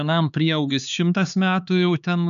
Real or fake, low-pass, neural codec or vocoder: fake; 7.2 kHz; codec, 16 kHz, 4 kbps, X-Codec, HuBERT features, trained on LibriSpeech